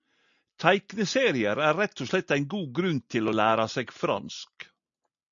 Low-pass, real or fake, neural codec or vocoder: 7.2 kHz; real; none